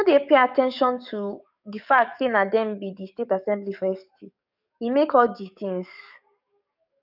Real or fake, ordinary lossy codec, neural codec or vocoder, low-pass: fake; none; codec, 44.1 kHz, 7.8 kbps, DAC; 5.4 kHz